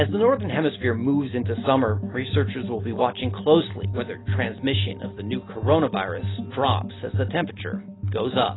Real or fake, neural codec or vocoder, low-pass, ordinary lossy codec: real; none; 7.2 kHz; AAC, 16 kbps